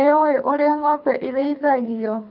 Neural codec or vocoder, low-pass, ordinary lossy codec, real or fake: codec, 24 kHz, 3 kbps, HILCodec; 5.4 kHz; none; fake